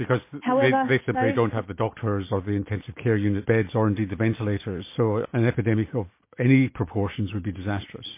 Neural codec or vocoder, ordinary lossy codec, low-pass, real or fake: none; MP3, 24 kbps; 3.6 kHz; real